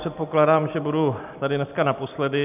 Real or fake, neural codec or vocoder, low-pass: real; none; 3.6 kHz